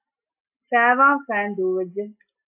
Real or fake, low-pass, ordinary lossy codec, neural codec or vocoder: real; 3.6 kHz; AAC, 32 kbps; none